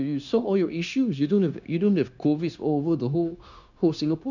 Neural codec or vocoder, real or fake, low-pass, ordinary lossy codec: codec, 16 kHz, 0.9 kbps, LongCat-Audio-Codec; fake; 7.2 kHz; none